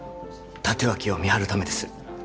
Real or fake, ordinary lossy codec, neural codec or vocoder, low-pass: real; none; none; none